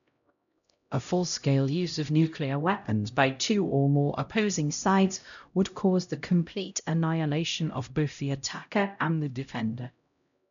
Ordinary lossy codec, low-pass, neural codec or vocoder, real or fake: none; 7.2 kHz; codec, 16 kHz, 0.5 kbps, X-Codec, HuBERT features, trained on LibriSpeech; fake